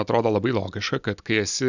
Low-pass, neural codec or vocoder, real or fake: 7.2 kHz; none; real